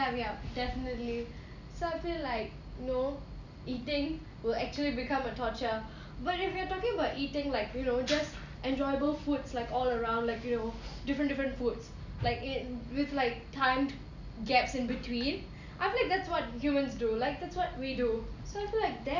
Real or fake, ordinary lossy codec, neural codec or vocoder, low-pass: real; none; none; 7.2 kHz